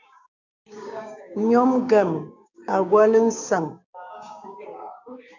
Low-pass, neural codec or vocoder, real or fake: 7.2 kHz; codec, 16 kHz, 6 kbps, DAC; fake